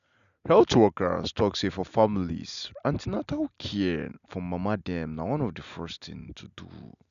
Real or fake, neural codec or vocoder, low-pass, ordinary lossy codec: real; none; 7.2 kHz; none